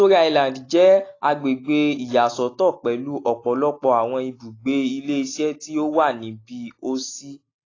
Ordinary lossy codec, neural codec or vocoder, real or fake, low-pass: AAC, 32 kbps; none; real; 7.2 kHz